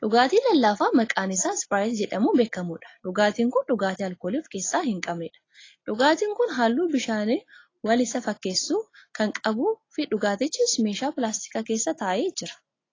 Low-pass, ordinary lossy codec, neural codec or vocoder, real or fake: 7.2 kHz; AAC, 32 kbps; none; real